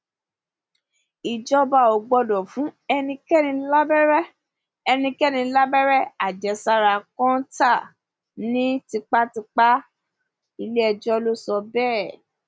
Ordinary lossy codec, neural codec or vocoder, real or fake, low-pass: none; none; real; none